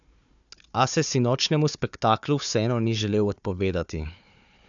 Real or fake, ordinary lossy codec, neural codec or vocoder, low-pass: fake; none; codec, 16 kHz, 4 kbps, FunCodec, trained on Chinese and English, 50 frames a second; 7.2 kHz